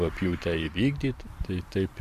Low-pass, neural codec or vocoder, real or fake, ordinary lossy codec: 14.4 kHz; vocoder, 44.1 kHz, 128 mel bands every 512 samples, BigVGAN v2; fake; MP3, 96 kbps